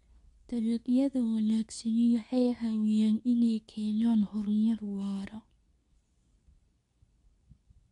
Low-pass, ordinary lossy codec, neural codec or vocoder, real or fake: 10.8 kHz; none; codec, 24 kHz, 0.9 kbps, WavTokenizer, medium speech release version 2; fake